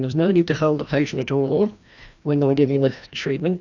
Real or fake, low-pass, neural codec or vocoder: fake; 7.2 kHz; codec, 16 kHz, 1 kbps, FreqCodec, larger model